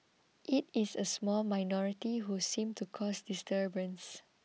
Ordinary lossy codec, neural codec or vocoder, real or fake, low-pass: none; none; real; none